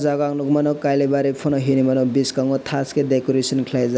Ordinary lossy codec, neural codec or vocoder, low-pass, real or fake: none; none; none; real